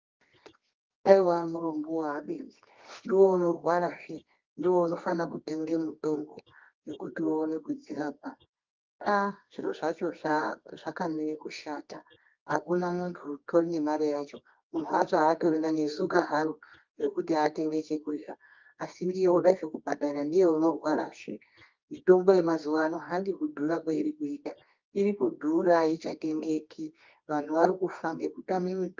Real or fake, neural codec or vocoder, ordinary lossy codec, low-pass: fake; codec, 24 kHz, 0.9 kbps, WavTokenizer, medium music audio release; Opus, 24 kbps; 7.2 kHz